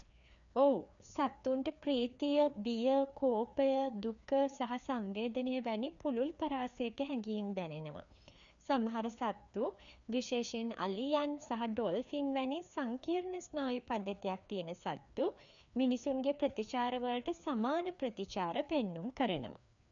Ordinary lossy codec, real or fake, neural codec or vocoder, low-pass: none; fake; codec, 16 kHz, 2 kbps, FreqCodec, larger model; 7.2 kHz